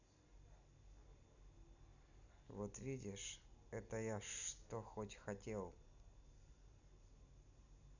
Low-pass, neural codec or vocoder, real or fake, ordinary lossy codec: 7.2 kHz; none; real; none